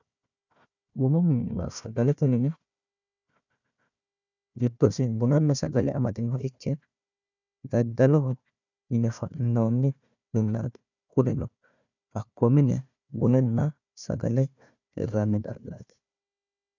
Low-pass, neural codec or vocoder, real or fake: 7.2 kHz; codec, 16 kHz, 1 kbps, FunCodec, trained on Chinese and English, 50 frames a second; fake